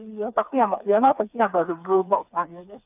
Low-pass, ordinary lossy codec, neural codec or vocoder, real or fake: 3.6 kHz; none; codec, 16 kHz, 4 kbps, FreqCodec, smaller model; fake